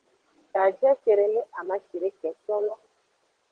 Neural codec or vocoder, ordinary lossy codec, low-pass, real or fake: vocoder, 22.05 kHz, 80 mel bands, WaveNeXt; Opus, 16 kbps; 9.9 kHz; fake